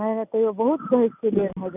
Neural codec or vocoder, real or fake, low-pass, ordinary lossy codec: none; real; 3.6 kHz; none